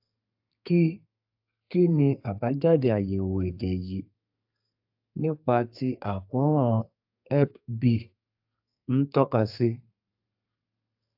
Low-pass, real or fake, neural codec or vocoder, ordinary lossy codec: 5.4 kHz; fake; codec, 32 kHz, 1.9 kbps, SNAC; AAC, 48 kbps